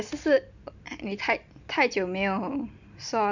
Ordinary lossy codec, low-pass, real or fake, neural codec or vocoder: none; 7.2 kHz; real; none